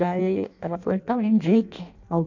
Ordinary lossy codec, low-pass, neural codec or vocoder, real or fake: none; 7.2 kHz; codec, 16 kHz in and 24 kHz out, 0.6 kbps, FireRedTTS-2 codec; fake